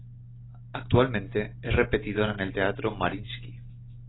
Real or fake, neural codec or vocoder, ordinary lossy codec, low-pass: real; none; AAC, 16 kbps; 7.2 kHz